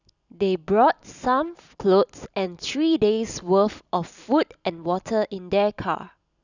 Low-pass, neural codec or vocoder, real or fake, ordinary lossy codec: 7.2 kHz; none; real; none